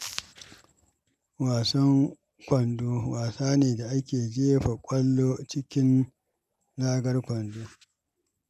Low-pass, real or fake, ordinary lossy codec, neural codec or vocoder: 14.4 kHz; real; none; none